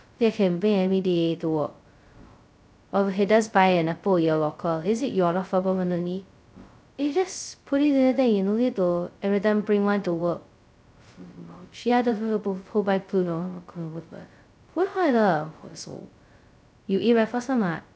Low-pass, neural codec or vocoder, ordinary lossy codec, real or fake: none; codec, 16 kHz, 0.2 kbps, FocalCodec; none; fake